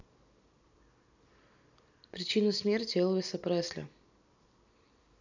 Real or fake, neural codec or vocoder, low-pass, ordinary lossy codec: real; none; 7.2 kHz; none